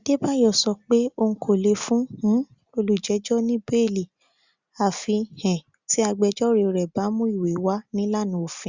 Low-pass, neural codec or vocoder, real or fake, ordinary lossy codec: 7.2 kHz; none; real; Opus, 64 kbps